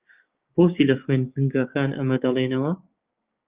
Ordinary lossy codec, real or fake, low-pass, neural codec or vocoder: Opus, 32 kbps; fake; 3.6 kHz; codec, 16 kHz, 6 kbps, DAC